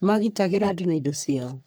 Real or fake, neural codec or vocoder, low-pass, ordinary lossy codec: fake; codec, 44.1 kHz, 3.4 kbps, Pupu-Codec; none; none